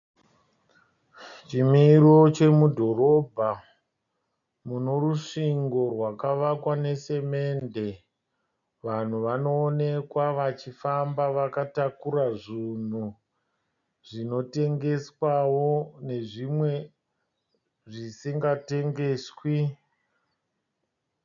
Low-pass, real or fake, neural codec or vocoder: 7.2 kHz; real; none